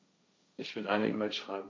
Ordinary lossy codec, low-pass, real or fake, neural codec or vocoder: none; none; fake; codec, 16 kHz, 1.1 kbps, Voila-Tokenizer